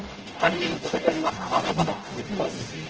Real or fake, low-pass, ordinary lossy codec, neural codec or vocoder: fake; 7.2 kHz; Opus, 16 kbps; codec, 44.1 kHz, 0.9 kbps, DAC